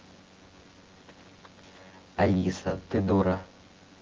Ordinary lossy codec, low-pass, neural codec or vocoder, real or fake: Opus, 16 kbps; 7.2 kHz; vocoder, 24 kHz, 100 mel bands, Vocos; fake